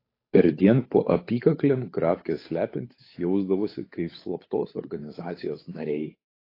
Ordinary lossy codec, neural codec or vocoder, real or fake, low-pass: AAC, 24 kbps; codec, 16 kHz, 8 kbps, FunCodec, trained on Chinese and English, 25 frames a second; fake; 5.4 kHz